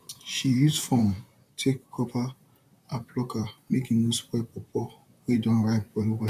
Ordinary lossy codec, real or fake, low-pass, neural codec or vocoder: none; fake; 14.4 kHz; vocoder, 44.1 kHz, 128 mel bands, Pupu-Vocoder